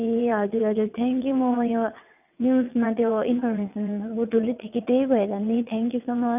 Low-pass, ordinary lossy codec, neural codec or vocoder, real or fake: 3.6 kHz; none; vocoder, 22.05 kHz, 80 mel bands, WaveNeXt; fake